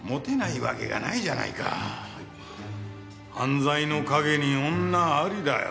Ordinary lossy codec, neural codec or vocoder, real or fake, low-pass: none; none; real; none